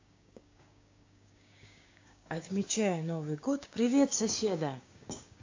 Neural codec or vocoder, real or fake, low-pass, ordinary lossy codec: codec, 16 kHz, 6 kbps, DAC; fake; 7.2 kHz; AAC, 48 kbps